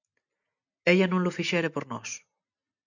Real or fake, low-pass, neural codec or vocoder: real; 7.2 kHz; none